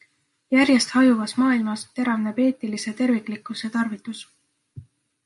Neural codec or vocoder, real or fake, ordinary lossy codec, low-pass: none; real; MP3, 48 kbps; 14.4 kHz